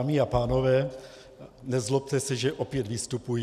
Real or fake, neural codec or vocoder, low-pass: real; none; 14.4 kHz